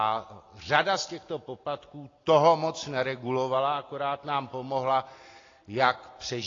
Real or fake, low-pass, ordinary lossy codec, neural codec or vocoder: real; 7.2 kHz; AAC, 32 kbps; none